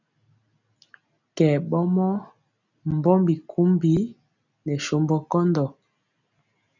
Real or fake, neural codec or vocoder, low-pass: real; none; 7.2 kHz